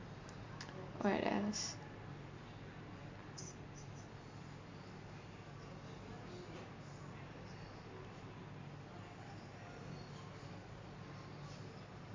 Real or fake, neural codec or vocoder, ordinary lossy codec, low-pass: real; none; MP3, 48 kbps; 7.2 kHz